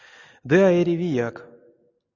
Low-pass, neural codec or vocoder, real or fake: 7.2 kHz; none; real